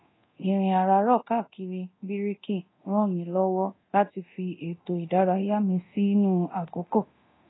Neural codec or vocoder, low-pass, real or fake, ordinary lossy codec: codec, 24 kHz, 0.9 kbps, DualCodec; 7.2 kHz; fake; AAC, 16 kbps